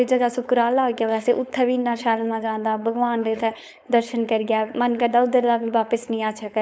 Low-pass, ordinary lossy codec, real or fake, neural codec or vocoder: none; none; fake; codec, 16 kHz, 4.8 kbps, FACodec